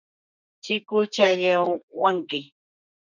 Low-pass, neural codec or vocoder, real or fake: 7.2 kHz; codec, 32 kHz, 1.9 kbps, SNAC; fake